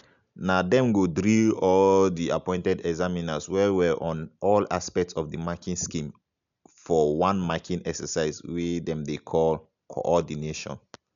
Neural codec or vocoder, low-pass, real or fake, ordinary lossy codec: none; 7.2 kHz; real; none